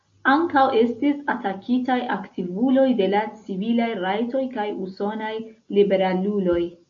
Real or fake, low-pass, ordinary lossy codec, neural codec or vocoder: real; 7.2 kHz; MP3, 48 kbps; none